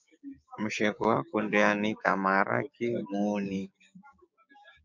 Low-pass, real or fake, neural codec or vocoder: 7.2 kHz; fake; codec, 16 kHz, 6 kbps, DAC